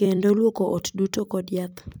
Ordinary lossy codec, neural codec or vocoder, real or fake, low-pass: none; vocoder, 44.1 kHz, 128 mel bands every 256 samples, BigVGAN v2; fake; none